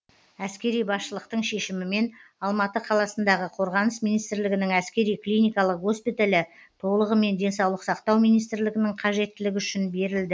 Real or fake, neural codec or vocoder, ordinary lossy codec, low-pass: real; none; none; none